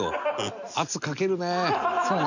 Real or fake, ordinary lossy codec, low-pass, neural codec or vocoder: real; none; 7.2 kHz; none